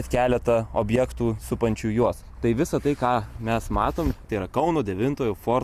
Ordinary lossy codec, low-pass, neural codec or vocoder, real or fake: Opus, 64 kbps; 14.4 kHz; none; real